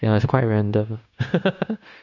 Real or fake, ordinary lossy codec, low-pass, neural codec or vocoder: fake; none; 7.2 kHz; codec, 16 kHz, 0.9 kbps, LongCat-Audio-Codec